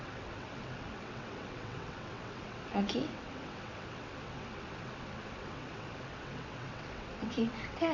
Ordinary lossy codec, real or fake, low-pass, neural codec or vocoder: none; fake; 7.2 kHz; vocoder, 22.05 kHz, 80 mel bands, WaveNeXt